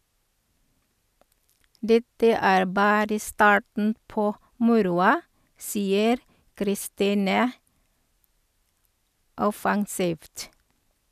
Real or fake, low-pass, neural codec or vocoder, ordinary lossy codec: real; 14.4 kHz; none; none